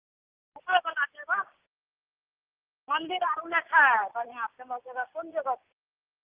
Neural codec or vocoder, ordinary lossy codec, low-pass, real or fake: none; Opus, 24 kbps; 3.6 kHz; real